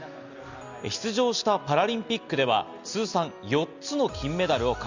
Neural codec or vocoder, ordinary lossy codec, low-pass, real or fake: none; Opus, 64 kbps; 7.2 kHz; real